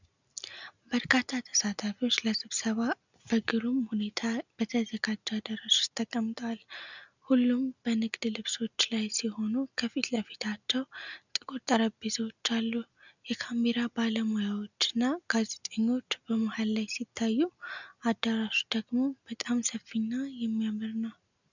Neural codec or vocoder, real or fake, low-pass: none; real; 7.2 kHz